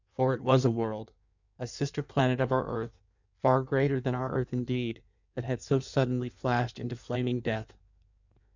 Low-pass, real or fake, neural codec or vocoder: 7.2 kHz; fake; codec, 16 kHz in and 24 kHz out, 1.1 kbps, FireRedTTS-2 codec